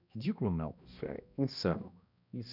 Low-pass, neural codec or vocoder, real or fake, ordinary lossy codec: 5.4 kHz; codec, 16 kHz, 1 kbps, X-Codec, HuBERT features, trained on balanced general audio; fake; none